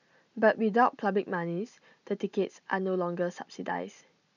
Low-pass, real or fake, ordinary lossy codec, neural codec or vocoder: 7.2 kHz; real; none; none